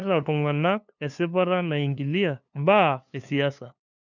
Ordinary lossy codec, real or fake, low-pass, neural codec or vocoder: none; fake; 7.2 kHz; codec, 16 kHz, 2 kbps, FunCodec, trained on LibriTTS, 25 frames a second